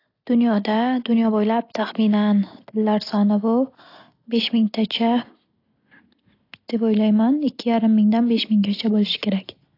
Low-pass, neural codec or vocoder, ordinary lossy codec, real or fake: 5.4 kHz; none; AAC, 32 kbps; real